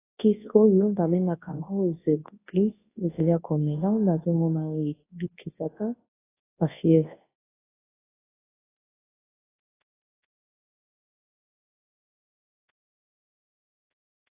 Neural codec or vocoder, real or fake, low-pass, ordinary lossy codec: codec, 24 kHz, 0.9 kbps, WavTokenizer, large speech release; fake; 3.6 kHz; AAC, 16 kbps